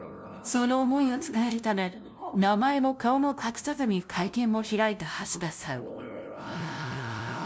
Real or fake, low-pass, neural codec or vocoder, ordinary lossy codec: fake; none; codec, 16 kHz, 0.5 kbps, FunCodec, trained on LibriTTS, 25 frames a second; none